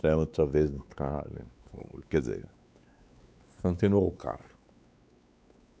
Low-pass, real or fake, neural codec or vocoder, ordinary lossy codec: none; fake; codec, 16 kHz, 4 kbps, X-Codec, WavLM features, trained on Multilingual LibriSpeech; none